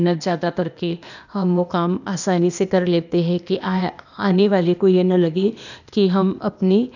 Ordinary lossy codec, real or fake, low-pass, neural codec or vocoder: none; fake; 7.2 kHz; codec, 16 kHz, 0.8 kbps, ZipCodec